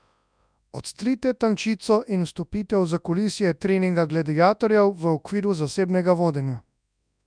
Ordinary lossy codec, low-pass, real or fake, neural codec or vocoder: none; 9.9 kHz; fake; codec, 24 kHz, 0.9 kbps, WavTokenizer, large speech release